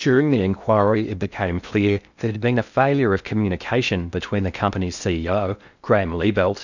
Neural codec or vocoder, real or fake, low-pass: codec, 16 kHz in and 24 kHz out, 0.6 kbps, FocalCodec, streaming, 2048 codes; fake; 7.2 kHz